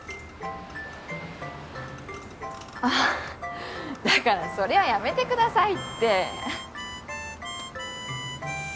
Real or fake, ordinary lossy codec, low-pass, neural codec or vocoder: real; none; none; none